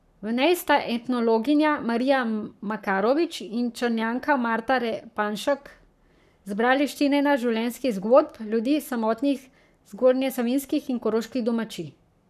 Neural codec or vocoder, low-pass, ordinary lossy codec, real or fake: codec, 44.1 kHz, 7.8 kbps, Pupu-Codec; 14.4 kHz; none; fake